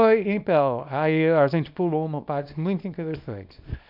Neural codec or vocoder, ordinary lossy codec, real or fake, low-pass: codec, 24 kHz, 0.9 kbps, WavTokenizer, small release; none; fake; 5.4 kHz